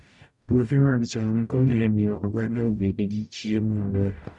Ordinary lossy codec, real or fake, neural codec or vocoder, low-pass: none; fake; codec, 44.1 kHz, 0.9 kbps, DAC; 10.8 kHz